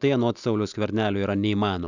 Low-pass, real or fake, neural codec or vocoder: 7.2 kHz; real; none